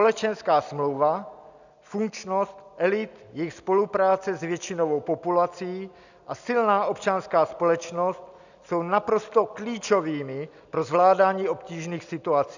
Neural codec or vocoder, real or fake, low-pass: none; real; 7.2 kHz